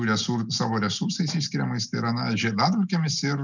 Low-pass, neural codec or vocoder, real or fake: 7.2 kHz; none; real